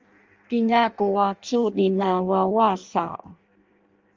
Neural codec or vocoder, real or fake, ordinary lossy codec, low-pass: codec, 16 kHz in and 24 kHz out, 0.6 kbps, FireRedTTS-2 codec; fake; Opus, 32 kbps; 7.2 kHz